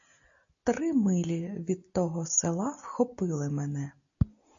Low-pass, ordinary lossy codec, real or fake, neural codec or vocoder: 7.2 kHz; AAC, 64 kbps; real; none